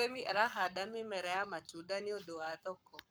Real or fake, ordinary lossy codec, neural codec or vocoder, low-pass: fake; none; codec, 44.1 kHz, 7.8 kbps, Pupu-Codec; none